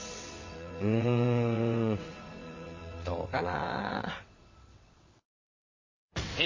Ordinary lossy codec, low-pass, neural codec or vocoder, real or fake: MP3, 32 kbps; 7.2 kHz; vocoder, 22.05 kHz, 80 mel bands, WaveNeXt; fake